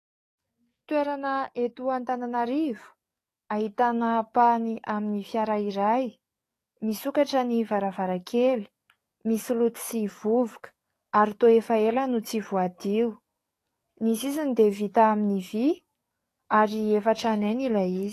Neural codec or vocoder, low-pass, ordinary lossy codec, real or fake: codec, 44.1 kHz, 7.8 kbps, DAC; 14.4 kHz; AAC, 48 kbps; fake